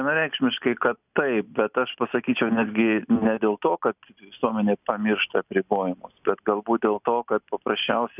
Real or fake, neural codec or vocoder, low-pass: real; none; 3.6 kHz